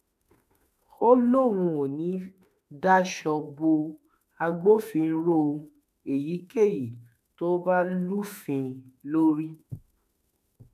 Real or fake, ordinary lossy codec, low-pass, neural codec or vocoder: fake; none; 14.4 kHz; autoencoder, 48 kHz, 32 numbers a frame, DAC-VAE, trained on Japanese speech